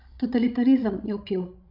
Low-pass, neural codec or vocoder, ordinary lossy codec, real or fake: 5.4 kHz; codec, 16 kHz, 16 kbps, FreqCodec, larger model; none; fake